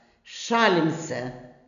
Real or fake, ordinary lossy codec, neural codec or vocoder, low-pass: real; none; none; 7.2 kHz